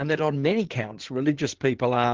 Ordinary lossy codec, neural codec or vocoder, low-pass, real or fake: Opus, 16 kbps; codec, 16 kHz in and 24 kHz out, 2.2 kbps, FireRedTTS-2 codec; 7.2 kHz; fake